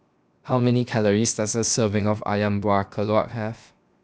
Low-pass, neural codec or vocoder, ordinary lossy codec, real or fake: none; codec, 16 kHz, 0.7 kbps, FocalCodec; none; fake